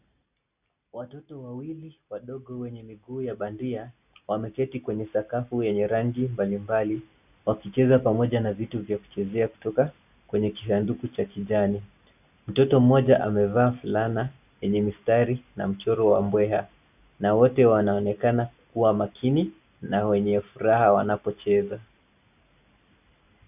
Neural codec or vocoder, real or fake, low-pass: none; real; 3.6 kHz